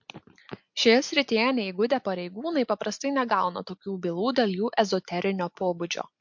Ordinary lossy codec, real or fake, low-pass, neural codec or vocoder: MP3, 48 kbps; real; 7.2 kHz; none